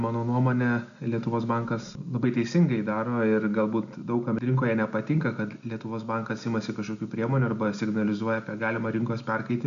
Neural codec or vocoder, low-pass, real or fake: none; 7.2 kHz; real